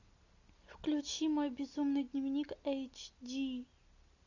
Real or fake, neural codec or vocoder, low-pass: real; none; 7.2 kHz